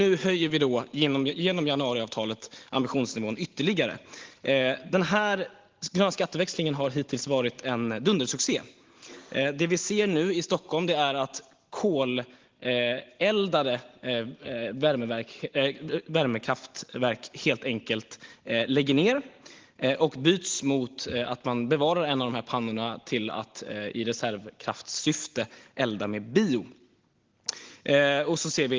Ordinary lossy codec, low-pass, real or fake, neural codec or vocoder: Opus, 16 kbps; 7.2 kHz; real; none